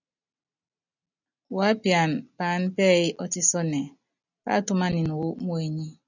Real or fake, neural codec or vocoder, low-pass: real; none; 7.2 kHz